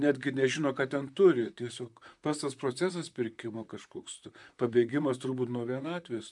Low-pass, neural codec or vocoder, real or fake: 10.8 kHz; vocoder, 44.1 kHz, 128 mel bands, Pupu-Vocoder; fake